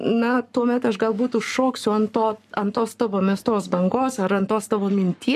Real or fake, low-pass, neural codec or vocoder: fake; 14.4 kHz; codec, 44.1 kHz, 7.8 kbps, Pupu-Codec